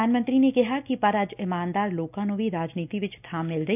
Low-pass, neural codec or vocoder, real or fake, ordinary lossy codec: 3.6 kHz; none; real; none